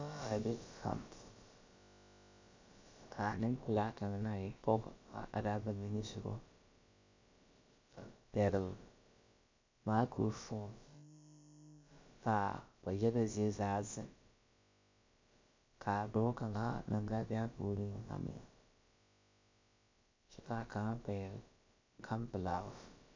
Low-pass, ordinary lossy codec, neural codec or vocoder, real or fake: 7.2 kHz; MP3, 64 kbps; codec, 16 kHz, about 1 kbps, DyCAST, with the encoder's durations; fake